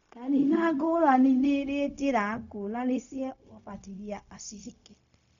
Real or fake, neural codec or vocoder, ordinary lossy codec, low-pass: fake; codec, 16 kHz, 0.4 kbps, LongCat-Audio-Codec; none; 7.2 kHz